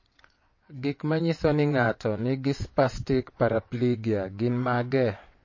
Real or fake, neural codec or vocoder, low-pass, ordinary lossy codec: fake; vocoder, 22.05 kHz, 80 mel bands, WaveNeXt; 7.2 kHz; MP3, 32 kbps